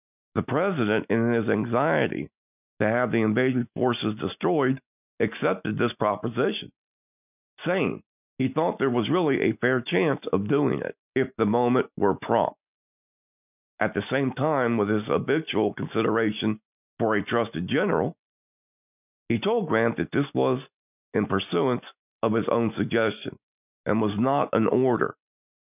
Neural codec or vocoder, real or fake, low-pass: none; real; 3.6 kHz